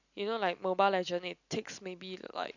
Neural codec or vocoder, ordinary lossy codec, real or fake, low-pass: none; none; real; 7.2 kHz